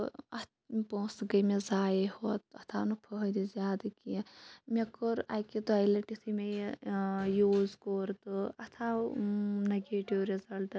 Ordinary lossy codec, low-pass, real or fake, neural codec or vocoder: none; none; real; none